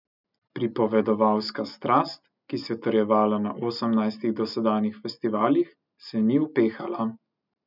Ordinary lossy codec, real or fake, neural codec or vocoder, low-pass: none; real; none; 5.4 kHz